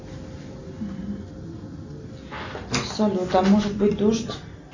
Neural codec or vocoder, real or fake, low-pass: none; real; 7.2 kHz